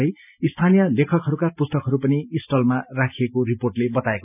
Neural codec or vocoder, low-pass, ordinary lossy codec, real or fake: none; 3.6 kHz; none; real